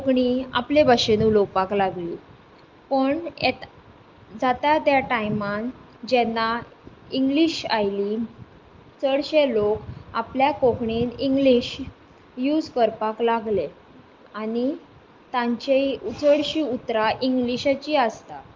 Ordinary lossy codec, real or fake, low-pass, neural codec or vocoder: Opus, 32 kbps; real; 7.2 kHz; none